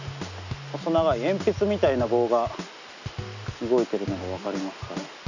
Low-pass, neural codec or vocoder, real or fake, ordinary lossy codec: 7.2 kHz; none; real; none